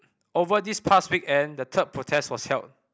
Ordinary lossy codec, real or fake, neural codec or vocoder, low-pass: none; real; none; none